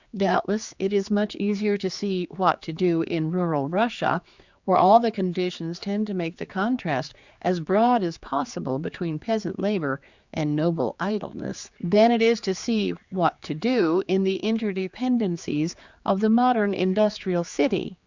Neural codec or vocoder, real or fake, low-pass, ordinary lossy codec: codec, 16 kHz, 4 kbps, X-Codec, HuBERT features, trained on general audio; fake; 7.2 kHz; Opus, 64 kbps